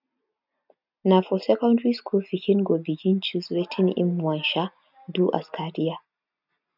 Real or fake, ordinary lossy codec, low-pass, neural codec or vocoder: real; none; 5.4 kHz; none